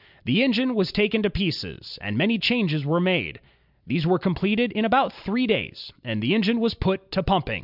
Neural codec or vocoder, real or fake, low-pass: none; real; 5.4 kHz